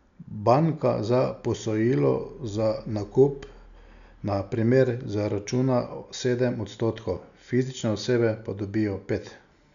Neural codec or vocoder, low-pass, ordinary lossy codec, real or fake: none; 7.2 kHz; none; real